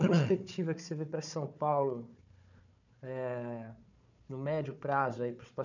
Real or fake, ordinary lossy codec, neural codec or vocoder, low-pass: fake; none; codec, 16 kHz, 4 kbps, FunCodec, trained on Chinese and English, 50 frames a second; 7.2 kHz